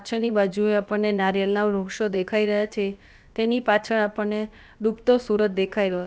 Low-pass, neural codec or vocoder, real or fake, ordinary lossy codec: none; codec, 16 kHz, about 1 kbps, DyCAST, with the encoder's durations; fake; none